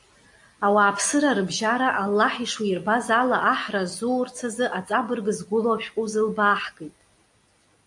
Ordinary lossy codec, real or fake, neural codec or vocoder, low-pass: AAC, 64 kbps; real; none; 10.8 kHz